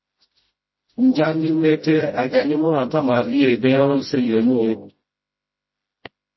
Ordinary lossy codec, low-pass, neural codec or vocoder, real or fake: MP3, 24 kbps; 7.2 kHz; codec, 16 kHz, 0.5 kbps, FreqCodec, smaller model; fake